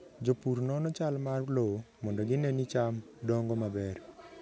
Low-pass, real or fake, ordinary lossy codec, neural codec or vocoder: none; real; none; none